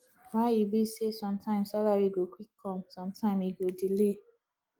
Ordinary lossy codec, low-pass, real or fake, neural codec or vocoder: Opus, 24 kbps; 19.8 kHz; real; none